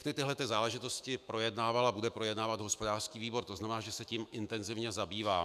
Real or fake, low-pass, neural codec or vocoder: fake; 14.4 kHz; autoencoder, 48 kHz, 128 numbers a frame, DAC-VAE, trained on Japanese speech